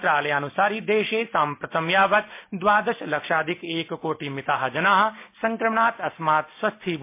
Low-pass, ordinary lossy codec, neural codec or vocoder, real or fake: 3.6 kHz; MP3, 24 kbps; none; real